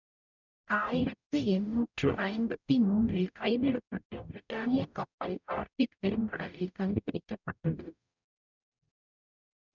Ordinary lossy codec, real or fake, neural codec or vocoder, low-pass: none; fake; codec, 44.1 kHz, 0.9 kbps, DAC; 7.2 kHz